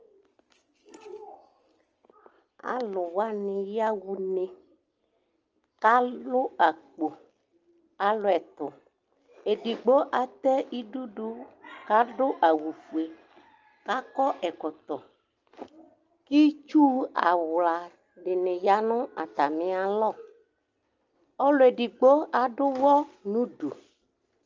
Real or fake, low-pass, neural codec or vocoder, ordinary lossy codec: real; 7.2 kHz; none; Opus, 24 kbps